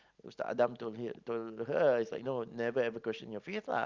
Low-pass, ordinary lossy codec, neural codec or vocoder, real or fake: 7.2 kHz; Opus, 32 kbps; none; real